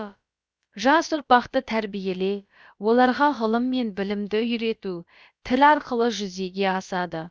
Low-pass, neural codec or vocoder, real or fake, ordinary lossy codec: none; codec, 16 kHz, about 1 kbps, DyCAST, with the encoder's durations; fake; none